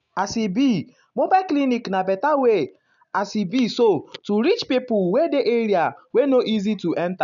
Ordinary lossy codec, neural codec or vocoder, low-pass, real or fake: none; none; 7.2 kHz; real